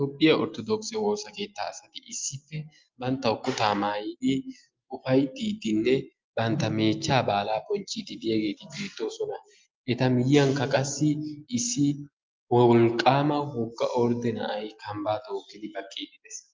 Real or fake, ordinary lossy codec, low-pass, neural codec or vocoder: real; Opus, 32 kbps; 7.2 kHz; none